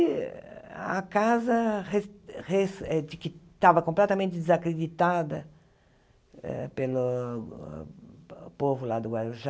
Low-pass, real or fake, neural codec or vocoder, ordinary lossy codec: none; real; none; none